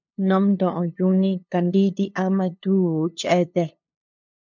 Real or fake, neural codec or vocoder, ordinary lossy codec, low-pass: fake; codec, 16 kHz, 2 kbps, FunCodec, trained on LibriTTS, 25 frames a second; MP3, 64 kbps; 7.2 kHz